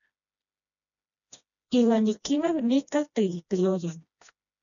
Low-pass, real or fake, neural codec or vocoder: 7.2 kHz; fake; codec, 16 kHz, 1 kbps, FreqCodec, smaller model